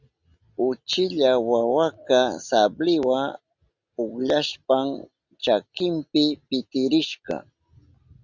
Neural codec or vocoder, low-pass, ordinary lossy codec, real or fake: none; 7.2 kHz; Opus, 64 kbps; real